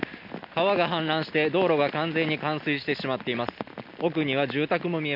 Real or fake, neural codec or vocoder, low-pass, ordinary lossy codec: real; none; 5.4 kHz; MP3, 48 kbps